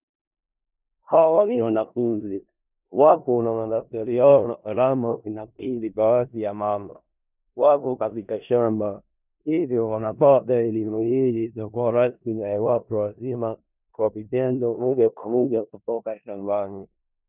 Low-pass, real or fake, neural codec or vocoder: 3.6 kHz; fake; codec, 16 kHz in and 24 kHz out, 0.4 kbps, LongCat-Audio-Codec, four codebook decoder